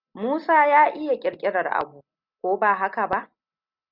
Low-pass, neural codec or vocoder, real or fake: 5.4 kHz; none; real